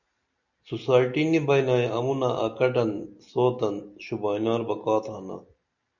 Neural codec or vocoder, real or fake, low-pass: none; real; 7.2 kHz